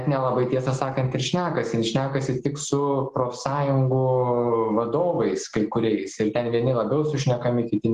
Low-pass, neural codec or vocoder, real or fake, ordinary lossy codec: 14.4 kHz; none; real; Opus, 16 kbps